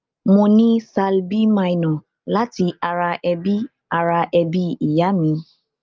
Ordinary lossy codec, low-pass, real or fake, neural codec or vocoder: Opus, 24 kbps; 7.2 kHz; real; none